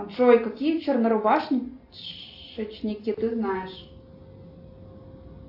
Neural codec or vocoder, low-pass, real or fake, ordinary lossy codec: none; 5.4 kHz; real; AAC, 32 kbps